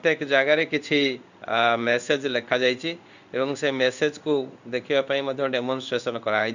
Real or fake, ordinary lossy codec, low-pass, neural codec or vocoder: fake; none; 7.2 kHz; codec, 16 kHz in and 24 kHz out, 1 kbps, XY-Tokenizer